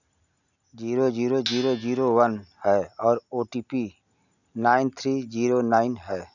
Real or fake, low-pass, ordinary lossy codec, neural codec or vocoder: real; 7.2 kHz; none; none